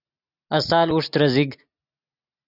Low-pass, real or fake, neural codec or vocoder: 5.4 kHz; real; none